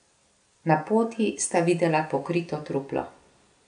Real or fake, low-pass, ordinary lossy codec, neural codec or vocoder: real; 9.9 kHz; AAC, 96 kbps; none